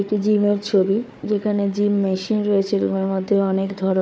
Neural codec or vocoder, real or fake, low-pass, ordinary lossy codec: codec, 16 kHz, 4 kbps, FunCodec, trained on Chinese and English, 50 frames a second; fake; none; none